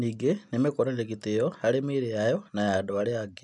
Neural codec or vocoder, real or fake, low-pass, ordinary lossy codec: none; real; 10.8 kHz; none